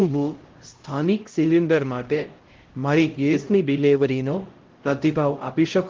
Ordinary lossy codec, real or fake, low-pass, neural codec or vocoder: Opus, 16 kbps; fake; 7.2 kHz; codec, 16 kHz, 0.5 kbps, X-Codec, HuBERT features, trained on LibriSpeech